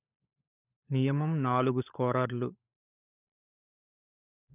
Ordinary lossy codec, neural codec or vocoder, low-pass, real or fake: none; codec, 16 kHz, 16 kbps, FunCodec, trained on LibriTTS, 50 frames a second; 3.6 kHz; fake